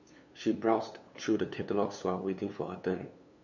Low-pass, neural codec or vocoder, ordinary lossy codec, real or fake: 7.2 kHz; codec, 16 kHz, 2 kbps, FunCodec, trained on LibriTTS, 25 frames a second; none; fake